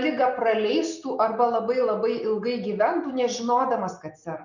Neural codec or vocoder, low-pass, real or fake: none; 7.2 kHz; real